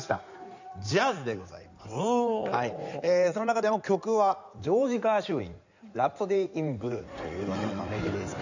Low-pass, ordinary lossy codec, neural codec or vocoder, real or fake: 7.2 kHz; none; codec, 16 kHz in and 24 kHz out, 2.2 kbps, FireRedTTS-2 codec; fake